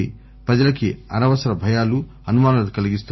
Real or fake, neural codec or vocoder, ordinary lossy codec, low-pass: real; none; MP3, 24 kbps; 7.2 kHz